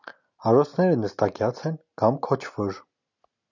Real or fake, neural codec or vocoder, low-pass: real; none; 7.2 kHz